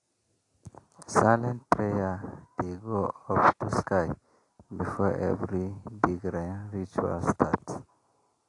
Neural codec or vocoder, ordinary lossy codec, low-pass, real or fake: none; AAC, 48 kbps; 10.8 kHz; real